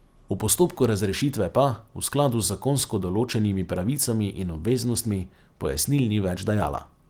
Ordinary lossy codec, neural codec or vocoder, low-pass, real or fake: Opus, 24 kbps; none; 19.8 kHz; real